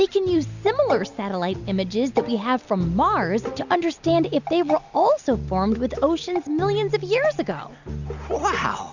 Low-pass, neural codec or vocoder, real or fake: 7.2 kHz; none; real